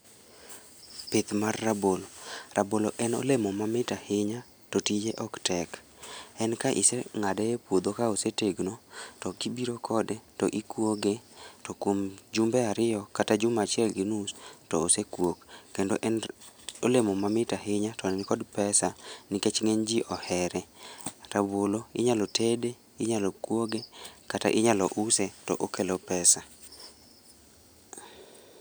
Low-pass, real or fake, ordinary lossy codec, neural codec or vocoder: none; real; none; none